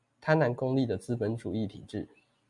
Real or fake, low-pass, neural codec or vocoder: real; 10.8 kHz; none